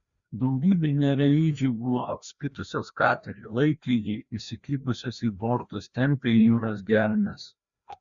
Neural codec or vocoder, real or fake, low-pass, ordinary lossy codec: codec, 16 kHz, 1 kbps, FreqCodec, larger model; fake; 7.2 kHz; Opus, 64 kbps